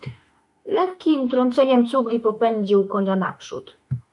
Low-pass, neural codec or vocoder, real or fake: 10.8 kHz; autoencoder, 48 kHz, 32 numbers a frame, DAC-VAE, trained on Japanese speech; fake